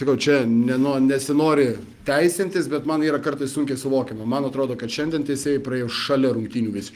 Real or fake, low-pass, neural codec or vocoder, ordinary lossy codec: real; 14.4 kHz; none; Opus, 24 kbps